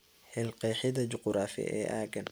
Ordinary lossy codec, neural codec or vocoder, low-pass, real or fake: none; none; none; real